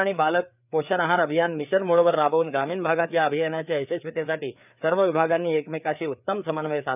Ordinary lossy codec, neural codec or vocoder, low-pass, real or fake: none; codec, 16 kHz, 4 kbps, FreqCodec, larger model; 3.6 kHz; fake